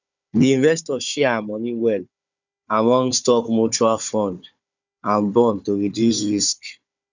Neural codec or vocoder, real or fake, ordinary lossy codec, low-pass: codec, 16 kHz, 4 kbps, FunCodec, trained on Chinese and English, 50 frames a second; fake; none; 7.2 kHz